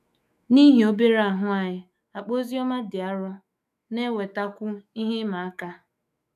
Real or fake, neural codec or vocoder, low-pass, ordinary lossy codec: fake; autoencoder, 48 kHz, 128 numbers a frame, DAC-VAE, trained on Japanese speech; 14.4 kHz; none